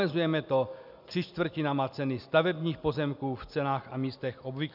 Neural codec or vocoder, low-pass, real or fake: none; 5.4 kHz; real